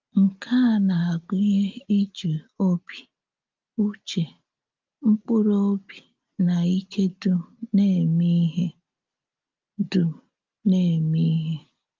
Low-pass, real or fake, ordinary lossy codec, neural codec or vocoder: 7.2 kHz; real; Opus, 32 kbps; none